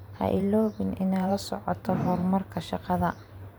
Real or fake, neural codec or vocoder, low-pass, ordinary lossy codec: fake; vocoder, 44.1 kHz, 128 mel bands every 512 samples, BigVGAN v2; none; none